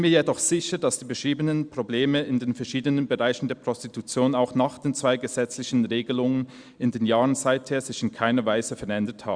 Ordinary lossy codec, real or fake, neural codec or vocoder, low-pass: Opus, 64 kbps; real; none; 9.9 kHz